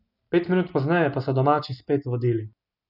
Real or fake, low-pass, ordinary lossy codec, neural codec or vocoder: real; 5.4 kHz; none; none